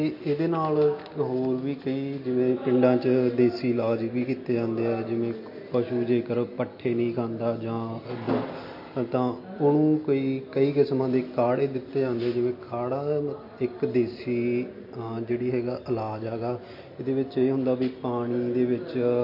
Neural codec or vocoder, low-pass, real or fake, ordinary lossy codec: none; 5.4 kHz; real; MP3, 32 kbps